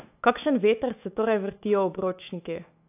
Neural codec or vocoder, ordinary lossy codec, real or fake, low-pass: autoencoder, 48 kHz, 128 numbers a frame, DAC-VAE, trained on Japanese speech; AAC, 32 kbps; fake; 3.6 kHz